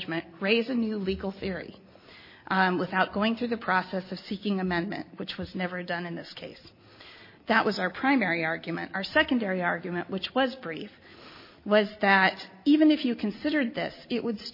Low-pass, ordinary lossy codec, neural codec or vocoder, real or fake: 5.4 kHz; MP3, 24 kbps; none; real